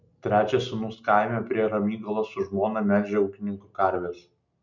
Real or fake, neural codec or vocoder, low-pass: real; none; 7.2 kHz